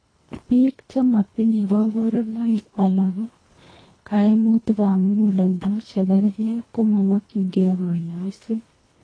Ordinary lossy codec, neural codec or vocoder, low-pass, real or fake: AAC, 32 kbps; codec, 24 kHz, 1.5 kbps, HILCodec; 9.9 kHz; fake